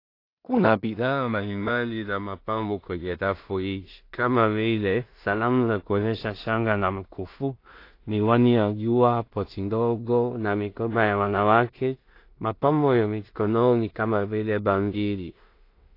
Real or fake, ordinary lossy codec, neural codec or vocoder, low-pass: fake; AAC, 32 kbps; codec, 16 kHz in and 24 kHz out, 0.4 kbps, LongCat-Audio-Codec, two codebook decoder; 5.4 kHz